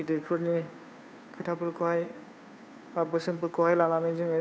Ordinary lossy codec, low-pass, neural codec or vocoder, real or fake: none; none; codec, 16 kHz, 2 kbps, FunCodec, trained on Chinese and English, 25 frames a second; fake